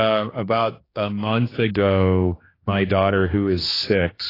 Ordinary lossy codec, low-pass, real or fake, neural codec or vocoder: AAC, 24 kbps; 5.4 kHz; fake; codec, 16 kHz, 2 kbps, X-Codec, HuBERT features, trained on general audio